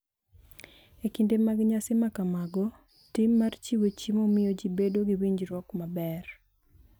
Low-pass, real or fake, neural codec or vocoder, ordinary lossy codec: none; real; none; none